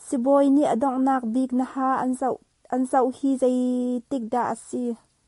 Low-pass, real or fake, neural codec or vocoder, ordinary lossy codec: 14.4 kHz; real; none; MP3, 48 kbps